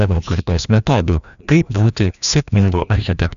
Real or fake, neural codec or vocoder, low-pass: fake; codec, 16 kHz, 1 kbps, FreqCodec, larger model; 7.2 kHz